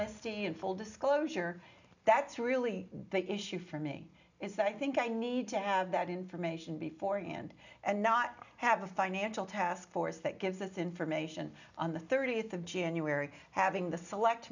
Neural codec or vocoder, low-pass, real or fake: none; 7.2 kHz; real